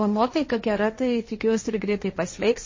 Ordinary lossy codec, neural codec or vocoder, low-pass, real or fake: MP3, 32 kbps; codec, 16 kHz, 1.1 kbps, Voila-Tokenizer; 7.2 kHz; fake